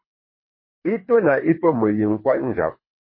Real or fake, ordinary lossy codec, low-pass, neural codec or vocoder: fake; MP3, 24 kbps; 5.4 kHz; codec, 24 kHz, 3 kbps, HILCodec